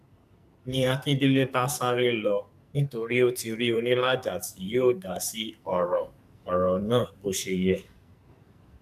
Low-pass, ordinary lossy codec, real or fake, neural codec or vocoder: 14.4 kHz; none; fake; codec, 44.1 kHz, 2.6 kbps, SNAC